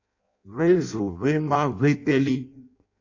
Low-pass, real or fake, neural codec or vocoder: 7.2 kHz; fake; codec, 16 kHz in and 24 kHz out, 0.6 kbps, FireRedTTS-2 codec